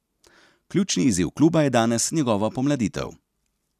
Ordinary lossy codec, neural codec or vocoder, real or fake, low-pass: none; none; real; 14.4 kHz